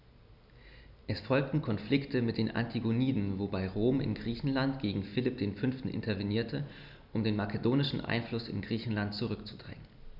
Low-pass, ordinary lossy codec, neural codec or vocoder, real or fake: 5.4 kHz; none; none; real